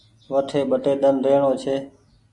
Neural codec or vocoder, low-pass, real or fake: none; 10.8 kHz; real